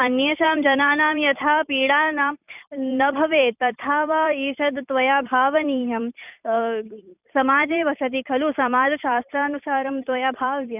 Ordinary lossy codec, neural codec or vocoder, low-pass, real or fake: none; vocoder, 44.1 kHz, 128 mel bands every 256 samples, BigVGAN v2; 3.6 kHz; fake